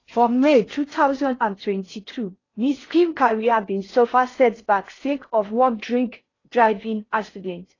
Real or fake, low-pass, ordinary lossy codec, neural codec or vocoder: fake; 7.2 kHz; AAC, 32 kbps; codec, 16 kHz in and 24 kHz out, 0.6 kbps, FocalCodec, streaming, 4096 codes